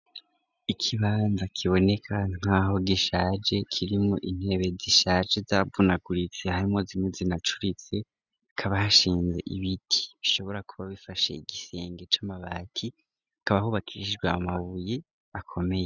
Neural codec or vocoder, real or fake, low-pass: none; real; 7.2 kHz